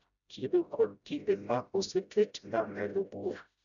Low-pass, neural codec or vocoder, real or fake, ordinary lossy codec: 7.2 kHz; codec, 16 kHz, 0.5 kbps, FreqCodec, smaller model; fake; none